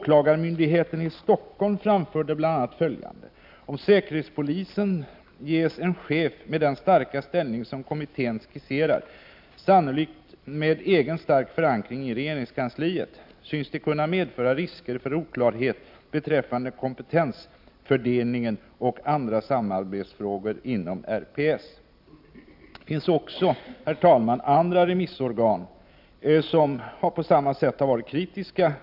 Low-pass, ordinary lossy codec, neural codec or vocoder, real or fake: 5.4 kHz; AAC, 48 kbps; none; real